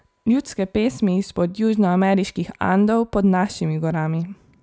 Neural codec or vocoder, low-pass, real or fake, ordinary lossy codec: none; none; real; none